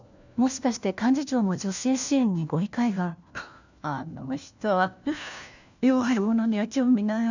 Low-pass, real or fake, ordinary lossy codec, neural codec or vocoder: 7.2 kHz; fake; none; codec, 16 kHz, 1 kbps, FunCodec, trained on LibriTTS, 50 frames a second